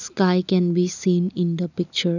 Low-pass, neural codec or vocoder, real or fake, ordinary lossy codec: 7.2 kHz; none; real; none